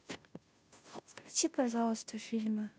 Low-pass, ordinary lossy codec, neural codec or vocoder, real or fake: none; none; codec, 16 kHz, 0.5 kbps, FunCodec, trained on Chinese and English, 25 frames a second; fake